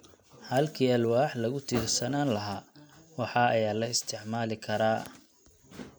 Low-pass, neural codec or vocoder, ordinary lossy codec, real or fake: none; none; none; real